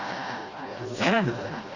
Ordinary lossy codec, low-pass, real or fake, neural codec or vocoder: Opus, 64 kbps; 7.2 kHz; fake; codec, 16 kHz, 1 kbps, FreqCodec, smaller model